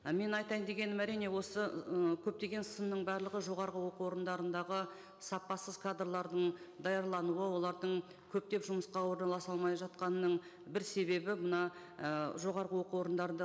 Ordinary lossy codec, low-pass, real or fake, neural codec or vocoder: none; none; real; none